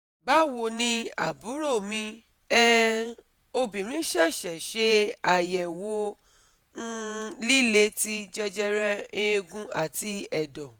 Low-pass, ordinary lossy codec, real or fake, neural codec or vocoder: none; none; fake; vocoder, 48 kHz, 128 mel bands, Vocos